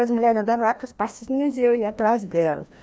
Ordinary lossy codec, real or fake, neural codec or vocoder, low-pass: none; fake; codec, 16 kHz, 1 kbps, FreqCodec, larger model; none